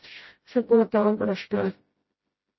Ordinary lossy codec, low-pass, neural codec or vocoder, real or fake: MP3, 24 kbps; 7.2 kHz; codec, 16 kHz, 0.5 kbps, FreqCodec, smaller model; fake